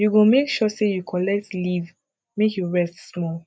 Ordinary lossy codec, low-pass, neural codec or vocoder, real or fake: none; none; none; real